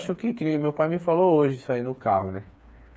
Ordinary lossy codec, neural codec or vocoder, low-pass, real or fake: none; codec, 16 kHz, 4 kbps, FreqCodec, smaller model; none; fake